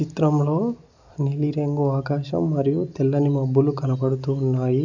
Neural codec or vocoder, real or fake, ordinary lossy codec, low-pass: none; real; none; 7.2 kHz